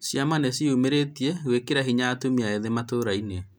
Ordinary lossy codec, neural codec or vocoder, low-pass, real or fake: none; none; none; real